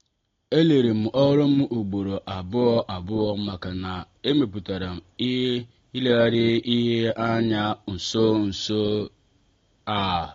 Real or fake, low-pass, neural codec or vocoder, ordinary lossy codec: real; 7.2 kHz; none; AAC, 32 kbps